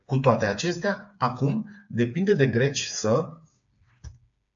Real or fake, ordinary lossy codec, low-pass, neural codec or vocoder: fake; AAC, 64 kbps; 7.2 kHz; codec, 16 kHz, 4 kbps, FreqCodec, smaller model